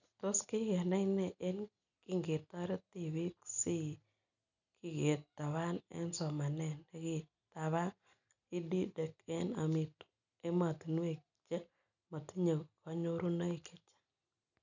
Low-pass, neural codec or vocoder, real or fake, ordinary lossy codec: 7.2 kHz; none; real; none